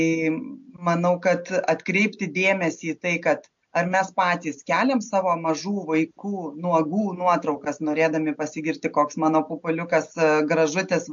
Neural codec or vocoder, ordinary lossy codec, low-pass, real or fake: none; MP3, 48 kbps; 7.2 kHz; real